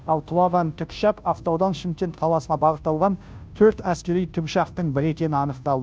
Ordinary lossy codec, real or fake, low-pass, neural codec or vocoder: none; fake; none; codec, 16 kHz, 0.5 kbps, FunCodec, trained on Chinese and English, 25 frames a second